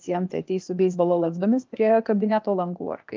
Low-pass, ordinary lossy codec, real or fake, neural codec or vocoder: 7.2 kHz; Opus, 24 kbps; fake; codec, 16 kHz, 0.8 kbps, ZipCodec